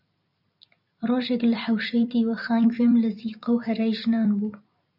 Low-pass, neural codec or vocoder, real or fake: 5.4 kHz; vocoder, 44.1 kHz, 128 mel bands every 256 samples, BigVGAN v2; fake